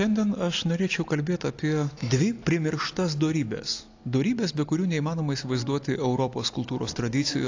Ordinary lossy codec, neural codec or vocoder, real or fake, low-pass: AAC, 48 kbps; none; real; 7.2 kHz